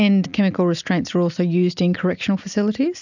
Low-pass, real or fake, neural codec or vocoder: 7.2 kHz; real; none